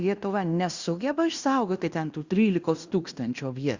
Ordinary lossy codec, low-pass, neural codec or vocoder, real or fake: Opus, 64 kbps; 7.2 kHz; codec, 16 kHz in and 24 kHz out, 0.9 kbps, LongCat-Audio-Codec, fine tuned four codebook decoder; fake